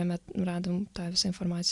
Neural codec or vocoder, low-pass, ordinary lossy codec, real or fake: none; 10.8 kHz; Opus, 64 kbps; real